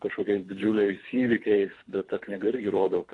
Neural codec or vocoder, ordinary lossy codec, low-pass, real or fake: codec, 24 kHz, 3 kbps, HILCodec; AAC, 48 kbps; 10.8 kHz; fake